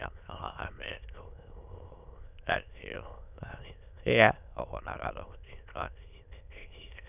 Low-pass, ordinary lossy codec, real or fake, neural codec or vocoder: 3.6 kHz; none; fake; autoencoder, 22.05 kHz, a latent of 192 numbers a frame, VITS, trained on many speakers